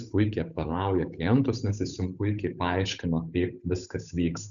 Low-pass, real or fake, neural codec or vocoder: 7.2 kHz; fake; codec, 16 kHz, 8 kbps, FunCodec, trained on Chinese and English, 25 frames a second